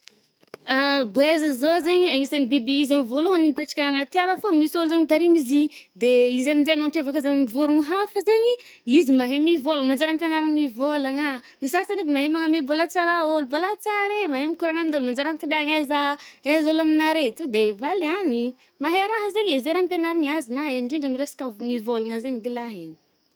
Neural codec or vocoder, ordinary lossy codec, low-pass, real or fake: codec, 44.1 kHz, 2.6 kbps, SNAC; none; none; fake